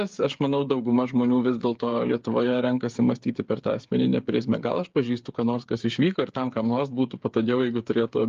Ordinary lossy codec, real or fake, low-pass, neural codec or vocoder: Opus, 32 kbps; fake; 7.2 kHz; codec, 16 kHz, 8 kbps, FreqCodec, smaller model